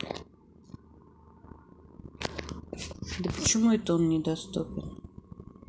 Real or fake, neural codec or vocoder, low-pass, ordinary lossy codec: real; none; none; none